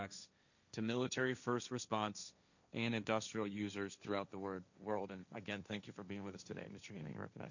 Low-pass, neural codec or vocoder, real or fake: 7.2 kHz; codec, 16 kHz, 1.1 kbps, Voila-Tokenizer; fake